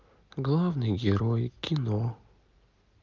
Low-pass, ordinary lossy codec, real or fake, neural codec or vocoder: 7.2 kHz; Opus, 24 kbps; real; none